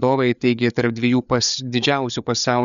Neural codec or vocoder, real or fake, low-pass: codec, 16 kHz, 4 kbps, FreqCodec, larger model; fake; 7.2 kHz